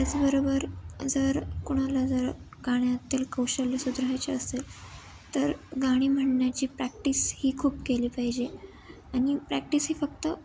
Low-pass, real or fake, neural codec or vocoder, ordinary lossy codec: none; real; none; none